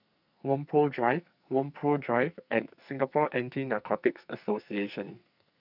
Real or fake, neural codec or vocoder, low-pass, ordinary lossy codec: fake; codec, 44.1 kHz, 2.6 kbps, SNAC; 5.4 kHz; none